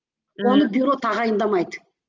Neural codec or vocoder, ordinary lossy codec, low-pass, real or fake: none; Opus, 32 kbps; 7.2 kHz; real